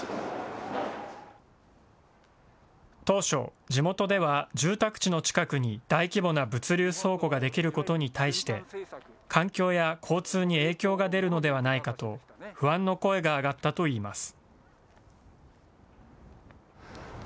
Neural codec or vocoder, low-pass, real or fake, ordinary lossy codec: none; none; real; none